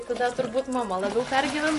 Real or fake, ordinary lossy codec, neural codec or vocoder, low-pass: real; MP3, 48 kbps; none; 14.4 kHz